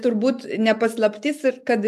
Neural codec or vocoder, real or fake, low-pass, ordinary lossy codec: none; real; 14.4 kHz; AAC, 96 kbps